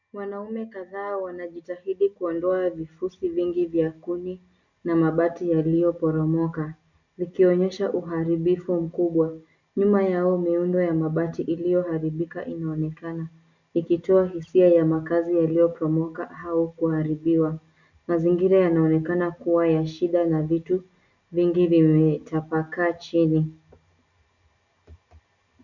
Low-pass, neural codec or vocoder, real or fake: 7.2 kHz; none; real